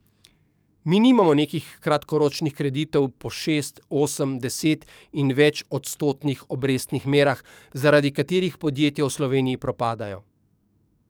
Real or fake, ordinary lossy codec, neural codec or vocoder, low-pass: fake; none; codec, 44.1 kHz, 7.8 kbps, DAC; none